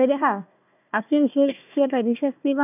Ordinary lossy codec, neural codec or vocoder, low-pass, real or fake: none; codec, 16 kHz, 1 kbps, FunCodec, trained on Chinese and English, 50 frames a second; 3.6 kHz; fake